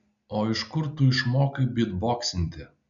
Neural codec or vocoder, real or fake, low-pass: none; real; 7.2 kHz